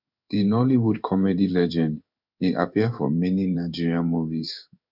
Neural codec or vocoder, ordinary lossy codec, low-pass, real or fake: codec, 16 kHz in and 24 kHz out, 1 kbps, XY-Tokenizer; none; 5.4 kHz; fake